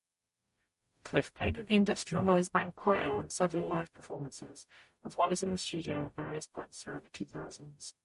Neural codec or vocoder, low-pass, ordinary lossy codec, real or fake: codec, 44.1 kHz, 0.9 kbps, DAC; 14.4 kHz; MP3, 48 kbps; fake